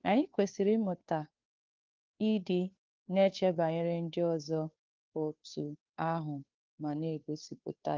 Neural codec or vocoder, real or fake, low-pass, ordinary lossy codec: codec, 16 kHz, 2 kbps, FunCodec, trained on Chinese and English, 25 frames a second; fake; 7.2 kHz; Opus, 32 kbps